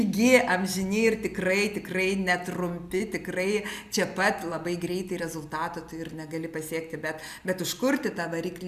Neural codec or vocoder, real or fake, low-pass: none; real; 14.4 kHz